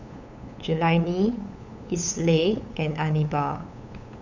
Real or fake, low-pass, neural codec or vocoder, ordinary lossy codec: fake; 7.2 kHz; codec, 16 kHz, 8 kbps, FunCodec, trained on LibriTTS, 25 frames a second; none